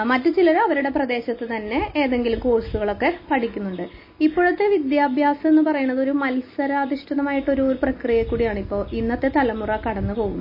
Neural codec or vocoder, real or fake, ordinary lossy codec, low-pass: none; real; MP3, 24 kbps; 5.4 kHz